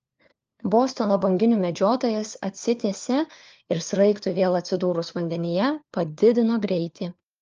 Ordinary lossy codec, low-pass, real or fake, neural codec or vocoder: Opus, 24 kbps; 7.2 kHz; fake; codec, 16 kHz, 4 kbps, FunCodec, trained on LibriTTS, 50 frames a second